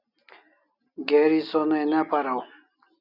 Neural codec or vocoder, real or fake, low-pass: none; real; 5.4 kHz